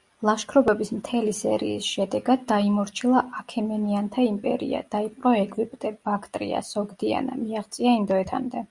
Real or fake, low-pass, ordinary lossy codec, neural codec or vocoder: real; 10.8 kHz; Opus, 64 kbps; none